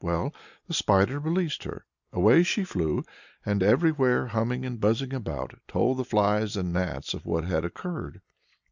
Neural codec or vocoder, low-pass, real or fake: none; 7.2 kHz; real